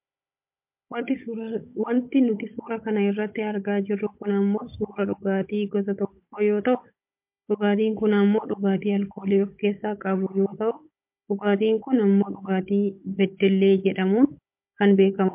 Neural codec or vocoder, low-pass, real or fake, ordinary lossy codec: codec, 16 kHz, 16 kbps, FunCodec, trained on Chinese and English, 50 frames a second; 3.6 kHz; fake; MP3, 32 kbps